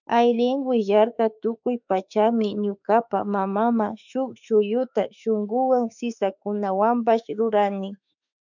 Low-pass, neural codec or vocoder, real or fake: 7.2 kHz; autoencoder, 48 kHz, 32 numbers a frame, DAC-VAE, trained on Japanese speech; fake